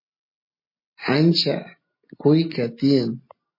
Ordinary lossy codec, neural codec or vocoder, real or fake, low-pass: MP3, 24 kbps; none; real; 5.4 kHz